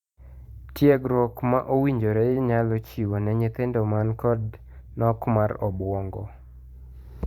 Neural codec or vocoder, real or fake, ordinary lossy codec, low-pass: vocoder, 44.1 kHz, 128 mel bands every 512 samples, BigVGAN v2; fake; Opus, 64 kbps; 19.8 kHz